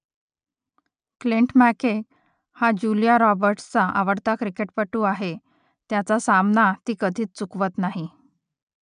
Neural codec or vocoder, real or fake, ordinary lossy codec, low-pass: none; real; none; 9.9 kHz